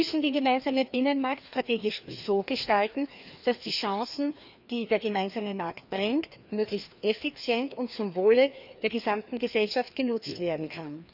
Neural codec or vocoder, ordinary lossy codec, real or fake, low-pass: codec, 16 kHz, 2 kbps, FreqCodec, larger model; none; fake; 5.4 kHz